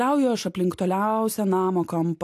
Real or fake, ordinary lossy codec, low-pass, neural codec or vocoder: real; AAC, 64 kbps; 14.4 kHz; none